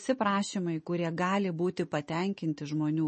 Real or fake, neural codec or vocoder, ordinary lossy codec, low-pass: real; none; MP3, 32 kbps; 9.9 kHz